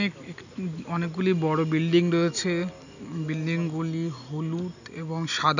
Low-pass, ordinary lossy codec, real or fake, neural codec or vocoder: 7.2 kHz; none; real; none